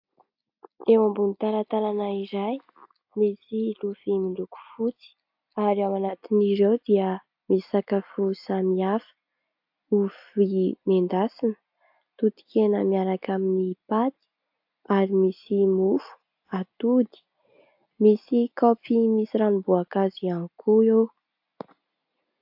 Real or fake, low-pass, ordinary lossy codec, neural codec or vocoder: real; 5.4 kHz; AAC, 48 kbps; none